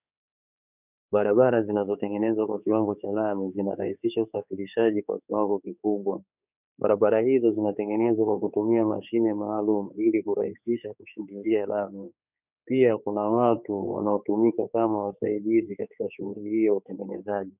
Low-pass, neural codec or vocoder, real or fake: 3.6 kHz; codec, 16 kHz, 4 kbps, X-Codec, HuBERT features, trained on general audio; fake